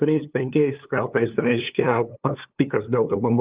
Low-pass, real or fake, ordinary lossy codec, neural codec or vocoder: 3.6 kHz; fake; Opus, 24 kbps; codec, 16 kHz, 2 kbps, FunCodec, trained on LibriTTS, 25 frames a second